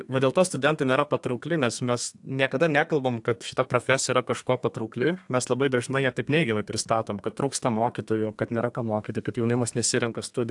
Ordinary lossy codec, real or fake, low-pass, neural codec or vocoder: AAC, 64 kbps; fake; 10.8 kHz; codec, 32 kHz, 1.9 kbps, SNAC